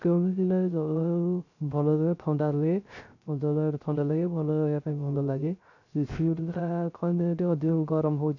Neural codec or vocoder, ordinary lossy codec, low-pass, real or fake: codec, 16 kHz, 0.3 kbps, FocalCodec; none; 7.2 kHz; fake